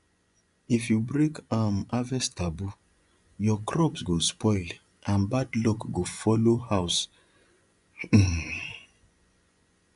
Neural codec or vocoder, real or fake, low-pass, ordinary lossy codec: vocoder, 24 kHz, 100 mel bands, Vocos; fake; 10.8 kHz; none